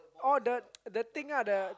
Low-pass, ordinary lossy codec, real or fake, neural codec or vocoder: none; none; real; none